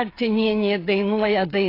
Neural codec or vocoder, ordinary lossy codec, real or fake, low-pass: codec, 16 kHz, 8 kbps, FreqCodec, smaller model; AAC, 24 kbps; fake; 5.4 kHz